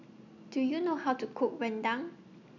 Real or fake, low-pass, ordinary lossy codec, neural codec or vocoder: real; 7.2 kHz; none; none